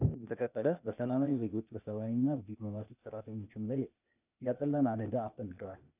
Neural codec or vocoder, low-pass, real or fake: codec, 16 kHz, 0.8 kbps, ZipCodec; 3.6 kHz; fake